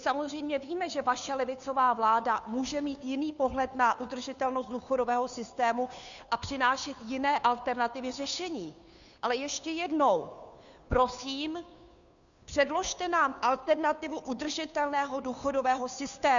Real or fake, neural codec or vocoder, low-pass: fake; codec, 16 kHz, 2 kbps, FunCodec, trained on Chinese and English, 25 frames a second; 7.2 kHz